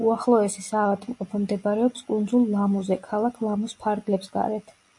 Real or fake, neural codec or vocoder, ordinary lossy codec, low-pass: real; none; MP3, 48 kbps; 10.8 kHz